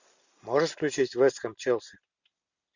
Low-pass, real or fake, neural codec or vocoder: 7.2 kHz; real; none